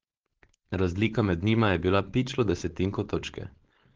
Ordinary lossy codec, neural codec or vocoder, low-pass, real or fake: Opus, 16 kbps; codec, 16 kHz, 4.8 kbps, FACodec; 7.2 kHz; fake